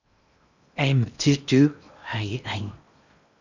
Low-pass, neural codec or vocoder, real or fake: 7.2 kHz; codec, 16 kHz in and 24 kHz out, 0.8 kbps, FocalCodec, streaming, 65536 codes; fake